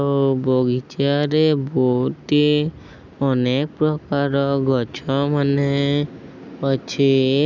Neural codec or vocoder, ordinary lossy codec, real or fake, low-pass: none; none; real; 7.2 kHz